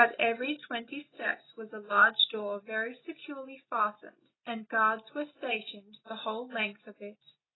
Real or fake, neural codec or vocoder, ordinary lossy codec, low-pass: real; none; AAC, 16 kbps; 7.2 kHz